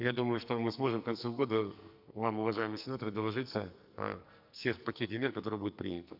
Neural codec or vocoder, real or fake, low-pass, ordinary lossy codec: codec, 44.1 kHz, 2.6 kbps, SNAC; fake; 5.4 kHz; none